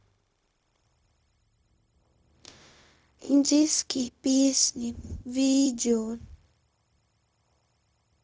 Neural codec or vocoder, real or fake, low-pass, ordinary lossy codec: codec, 16 kHz, 0.4 kbps, LongCat-Audio-Codec; fake; none; none